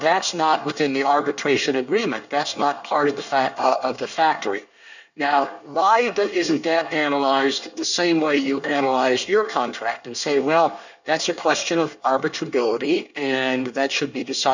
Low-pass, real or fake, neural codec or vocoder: 7.2 kHz; fake; codec, 24 kHz, 1 kbps, SNAC